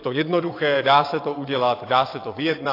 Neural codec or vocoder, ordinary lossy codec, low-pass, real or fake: vocoder, 22.05 kHz, 80 mel bands, WaveNeXt; AAC, 32 kbps; 5.4 kHz; fake